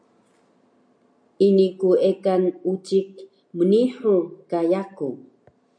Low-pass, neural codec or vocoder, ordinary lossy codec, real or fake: 9.9 kHz; none; MP3, 96 kbps; real